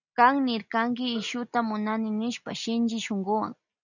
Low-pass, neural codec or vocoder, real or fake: 7.2 kHz; none; real